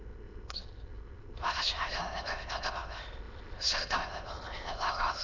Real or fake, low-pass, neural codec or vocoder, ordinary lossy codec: fake; 7.2 kHz; autoencoder, 22.05 kHz, a latent of 192 numbers a frame, VITS, trained on many speakers; none